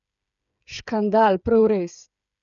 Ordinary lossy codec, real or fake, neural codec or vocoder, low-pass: none; fake; codec, 16 kHz, 8 kbps, FreqCodec, smaller model; 7.2 kHz